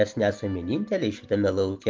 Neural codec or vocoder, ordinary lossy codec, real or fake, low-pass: none; Opus, 24 kbps; real; 7.2 kHz